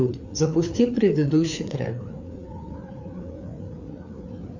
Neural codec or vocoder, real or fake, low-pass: codec, 16 kHz, 4 kbps, FreqCodec, larger model; fake; 7.2 kHz